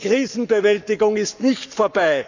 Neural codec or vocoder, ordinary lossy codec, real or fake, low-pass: codec, 44.1 kHz, 7.8 kbps, Pupu-Codec; none; fake; 7.2 kHz